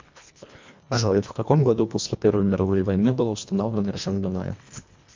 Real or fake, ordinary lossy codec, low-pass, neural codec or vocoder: fake; MP3, 64 kbps; 7.2 kHz; codec, 24 kHz, 1.5 kbps, HILCodec